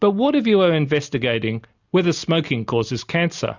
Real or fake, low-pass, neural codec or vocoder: real; 7.2 kHz; none